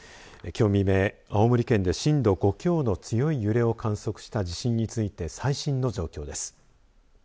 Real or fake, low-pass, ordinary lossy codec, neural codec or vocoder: real; none; none; none